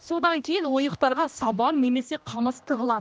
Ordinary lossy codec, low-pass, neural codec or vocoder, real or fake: none; none; codec, 16 kHz, 1 kbps, X-Codec, HuBERT features, trained on general audio; fake